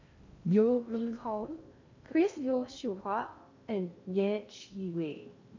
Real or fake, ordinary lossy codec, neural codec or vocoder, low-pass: fake; MP3, 64 kbps; codec, 16 kHz in and 24 kHz out, 0.6 kbps, FocalCodec, streaming, 2048 codes; 7.2 kHz